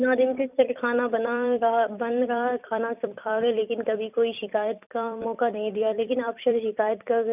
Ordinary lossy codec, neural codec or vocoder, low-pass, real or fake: none; vocoder, 44.1 kHz, 128 mel bands every 256 samples, BigVGAN v2; 3.6 kHz; fake